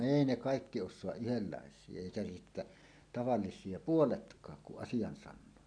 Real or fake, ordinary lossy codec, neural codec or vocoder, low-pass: real; none; none; 9.9 kHz